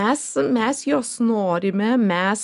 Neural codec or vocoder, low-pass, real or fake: none; 10.8 kHz; real